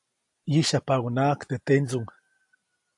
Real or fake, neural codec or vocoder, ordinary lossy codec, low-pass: real; none; AAC, 64 kbps; 10.8 kHz